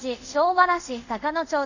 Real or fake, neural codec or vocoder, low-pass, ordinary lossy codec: fake; codec, 24 kHz, 0.5 kbps, DualCodec; 7.2 kHz; none